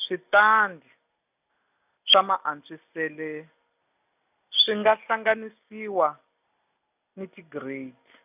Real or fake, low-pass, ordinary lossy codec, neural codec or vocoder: real; 3.6 kHz; none; none